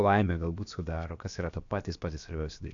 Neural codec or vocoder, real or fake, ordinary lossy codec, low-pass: codec, 16 kHz, about 1 kbps, DyCAST, with the encoder's durations; fake; AAC, 64 kbps; 7.2 kHz